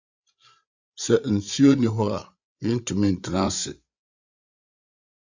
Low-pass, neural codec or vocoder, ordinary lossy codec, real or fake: 7.2 kHz; codec, 16 kHz, 8 kbps, FreqCodec, larger model; Opus, 64 kbps; fake